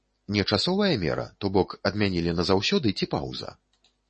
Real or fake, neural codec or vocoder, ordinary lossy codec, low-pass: real; none; MP3, 32 kbps; 10.8 kHz